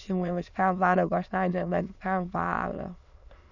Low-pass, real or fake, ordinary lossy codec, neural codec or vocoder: 7.2 kHz; fake; none; autoencoder, 22.05 kHz, a latent of 192 numbers a frame, VITS, trained on many speakers